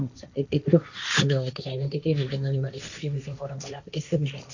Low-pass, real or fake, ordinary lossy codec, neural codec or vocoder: 7.2 kHz; fake; none; codec, 16 kHz, 1.1 kbps, Voila-Tokenizer